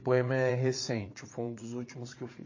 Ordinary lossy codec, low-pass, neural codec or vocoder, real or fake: MP3, 32 kbps; 7.2 kHz; vocoder, 22.05 kHz, 80 mel bands, Vocos; fake